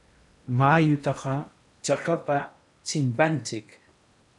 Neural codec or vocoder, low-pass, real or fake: codec, 16 kHz in and 24 kHz out, 0.8 kbps, FocalCodec, streaming, 65536 codes; 10.8 kHz; fake